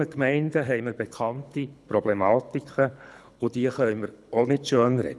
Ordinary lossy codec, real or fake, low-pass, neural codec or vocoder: none; fake; none; codec, 24 kHz, 6 kbps, HILCodec